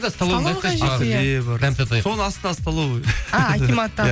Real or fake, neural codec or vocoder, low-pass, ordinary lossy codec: real; none; none; none